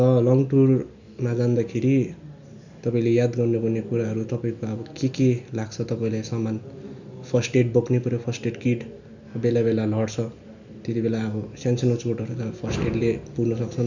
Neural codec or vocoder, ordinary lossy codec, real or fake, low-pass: none; none; real; 7.2 kHz